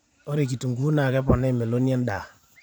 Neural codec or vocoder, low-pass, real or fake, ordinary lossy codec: vocoder, 44.1 kHz, 128 mel bands every 512 samples, BigVGAN v2; 19.8 kHz; fake; none